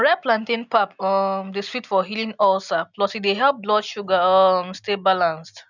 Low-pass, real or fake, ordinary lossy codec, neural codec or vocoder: 7.2 kHz; real; none; none